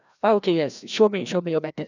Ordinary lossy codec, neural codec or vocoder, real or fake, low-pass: none; codec, 16 kHz, 1 kbps, FreqCodec, larger model; fake; 7.2 kHz